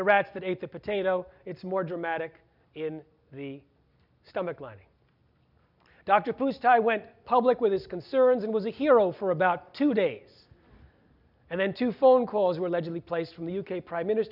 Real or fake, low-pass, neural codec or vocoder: real; 5.4 kHz; none